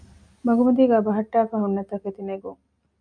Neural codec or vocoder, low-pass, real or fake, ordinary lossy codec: none; 9.9 kHz; real; MP3, 64 kbps